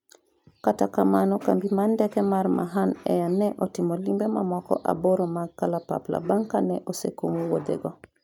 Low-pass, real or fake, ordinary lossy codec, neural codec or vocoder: 19.8 kHz; real; none; none